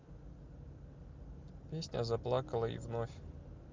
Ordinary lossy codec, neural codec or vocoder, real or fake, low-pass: Opus, 32 kbps; none; real; 7.2 kHz